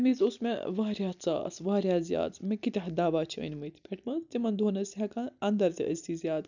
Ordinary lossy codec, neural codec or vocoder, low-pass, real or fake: none; none; 7.2 kHz; real